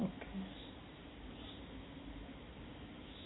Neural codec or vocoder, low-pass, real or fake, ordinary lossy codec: none; 7.2 kHz; real; AAC, 16 kbps